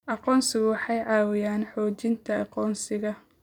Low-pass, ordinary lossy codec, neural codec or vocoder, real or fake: 19.8 kHz; none; vocoder, 44.1 kHz, 128 mel bands every 256 samples, BigVGAN v2; fake